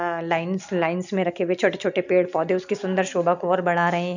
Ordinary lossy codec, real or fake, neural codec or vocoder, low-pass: none; real; none; 7.2 kHz